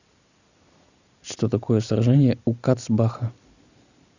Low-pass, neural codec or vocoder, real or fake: 7.2 kHz; none; real